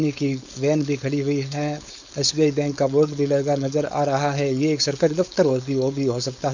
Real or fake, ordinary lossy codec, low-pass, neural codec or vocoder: fake; none; 7.2 kHz; codec, 16 kHz, 4.8 kbps, FACodec